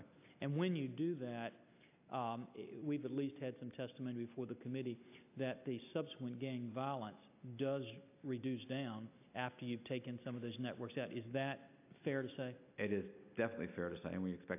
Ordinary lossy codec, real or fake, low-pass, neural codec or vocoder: AAC, 32 kbps; real; 3.6 kHz; none